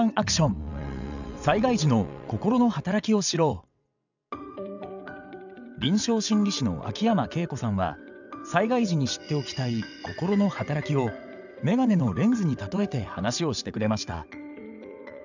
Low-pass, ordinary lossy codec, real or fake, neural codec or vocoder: 7.2 kHz; none; fake; vocoder, 22.05 kHz, 80 mel bands, WaveNeXt